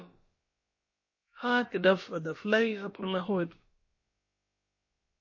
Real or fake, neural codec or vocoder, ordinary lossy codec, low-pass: fake; codec, 16 kHz, about 1 kbps, DyCAST, with the encoder's durations; MP3, 32 kbps; 7.2 kHz